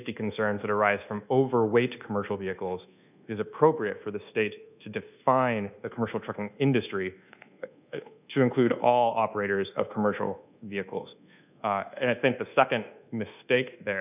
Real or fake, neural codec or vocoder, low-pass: fake; codec, 24 kHz, 1.2 kbps, DualCodec; 3.6 kHz